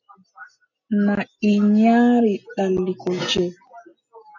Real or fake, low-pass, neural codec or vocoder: real; 7.2 kHz; none